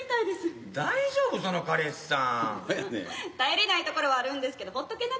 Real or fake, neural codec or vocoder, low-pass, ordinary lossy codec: real; none; none; none